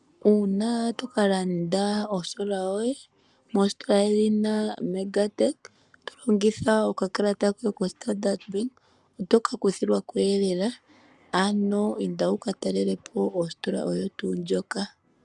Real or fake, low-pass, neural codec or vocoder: fake; 10.8 kHz; codec, 44.1 kHz, 7.8 kbps, Pupu-Codec